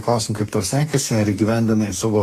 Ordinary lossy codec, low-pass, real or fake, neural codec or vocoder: AAC, 48 kbps; 14.4 kHz; fake; codec, 44.1 kHz, 2.6 kbps, DAC